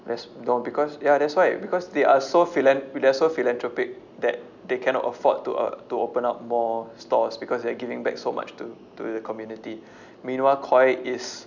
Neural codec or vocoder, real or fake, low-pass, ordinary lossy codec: none; real; 7.2 kHz; none